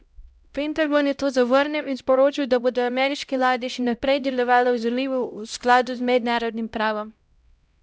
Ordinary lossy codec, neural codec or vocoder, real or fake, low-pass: none; codec, 16 kHz, 0.5 kbps, X-Codec, HuBERT features, trained on LibriSpeech; fake; none